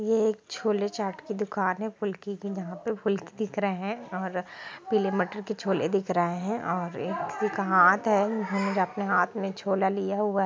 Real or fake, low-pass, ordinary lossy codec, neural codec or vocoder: real; none; none; none